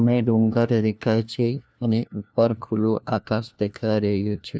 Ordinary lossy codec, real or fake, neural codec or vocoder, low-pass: none; fake; codec, 16 kHz, 1 kbps, FunCodec, trained on LibriTTS, 50 frames a second; none